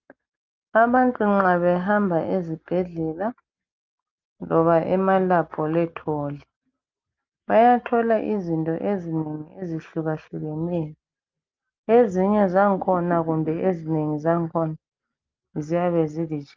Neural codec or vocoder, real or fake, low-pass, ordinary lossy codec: none; real; 7.2 kHz; Opus, 24 kbps